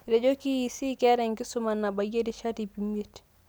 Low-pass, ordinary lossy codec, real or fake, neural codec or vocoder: none; none; real; none